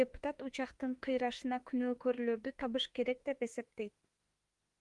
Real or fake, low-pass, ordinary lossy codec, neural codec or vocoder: fake; 10.8 kHz; Opus, 24 kbps; autoencoder, 48 kHz, 32 numbers a frame, DAC-VAE, trained on Japanese speech